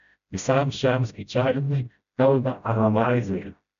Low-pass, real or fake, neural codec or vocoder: 7.2 kHz; fake; codec, 16 kHz, 0.5 kbps, FreqCodec, smaller model